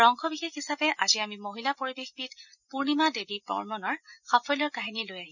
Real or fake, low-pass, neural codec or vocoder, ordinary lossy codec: real; 7.2 kHz; none; none